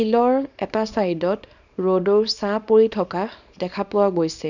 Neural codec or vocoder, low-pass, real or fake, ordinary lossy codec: codec, 24 kHz, 0.9 kbps, WavTokenizer, small release; 7.2 kHz; fake; none